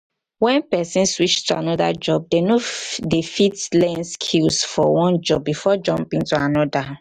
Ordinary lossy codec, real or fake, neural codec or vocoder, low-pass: none; real; none; 14.4 kHz